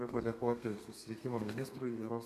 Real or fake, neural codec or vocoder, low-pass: fake; codec, 32 kHz, 1.9 kbps, SNAC; 14.4 kHz